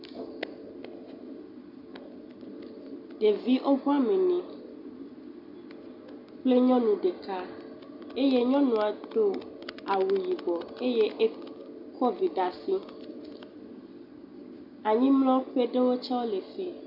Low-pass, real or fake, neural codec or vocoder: 5.4 kHz; real; none